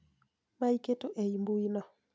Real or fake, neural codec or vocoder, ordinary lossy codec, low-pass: real; none; none; none